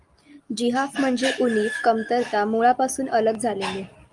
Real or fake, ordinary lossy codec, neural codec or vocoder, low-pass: real; Opus, 32 kbps; none; 10.8 kHz